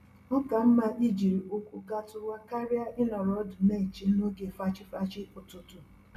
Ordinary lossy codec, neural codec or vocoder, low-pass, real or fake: none; none; 14.4 kHz; real